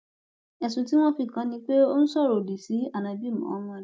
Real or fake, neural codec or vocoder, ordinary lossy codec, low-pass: real; none; none; none